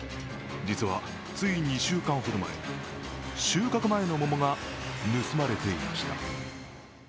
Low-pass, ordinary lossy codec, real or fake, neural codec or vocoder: none; none; real; none